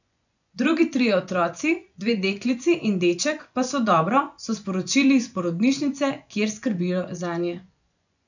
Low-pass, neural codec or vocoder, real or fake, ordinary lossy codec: 7.2 kHz; vocoder, 24 kHz, 100 mel bands, Vocos; fake; none